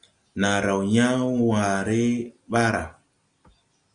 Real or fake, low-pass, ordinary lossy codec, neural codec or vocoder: real; 9.9 kHz; Opus, 64 kbps; none